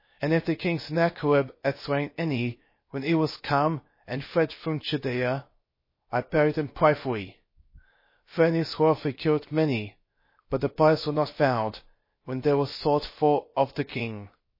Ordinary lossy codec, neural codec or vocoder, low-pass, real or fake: MP3, 24 kbps; codec, 16 kHz, 0.7 kbps, FocalCodec; 5.4 kHz; fake